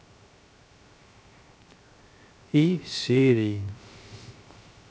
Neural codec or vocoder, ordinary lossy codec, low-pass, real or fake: codec, 16 kHz, 0.3 kbps, FocalCodec; none; none; fake